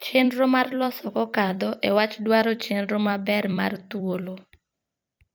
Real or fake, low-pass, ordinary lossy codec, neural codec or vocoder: fake; none; none; vocoder, 44.1 kHz, 128 mel bands every 256 samples, BigVGAN v2